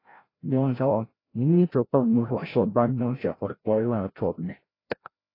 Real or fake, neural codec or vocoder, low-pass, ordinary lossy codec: fake; codec, 16 kHz, 0.5 kbps, FreqCodec, larger model; 5.4 kHz; AAC, 24 kbps